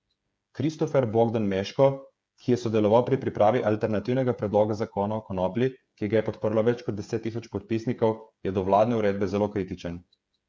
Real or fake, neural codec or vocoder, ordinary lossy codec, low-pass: fake; codec, 16 kHz, 8 kbps, FreqCodec, smaller model; none; none